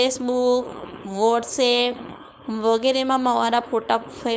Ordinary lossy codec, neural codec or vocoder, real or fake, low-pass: none; codec, 16 kHz, 4.8 kbps, FACodec; fake; none